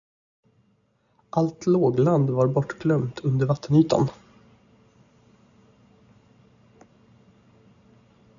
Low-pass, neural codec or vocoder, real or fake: 7.2 kHz; none; real